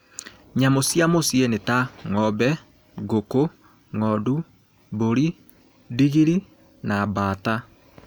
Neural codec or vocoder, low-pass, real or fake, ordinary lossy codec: none; none; real; none